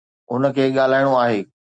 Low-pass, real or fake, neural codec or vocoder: 9.9 kHz; real; none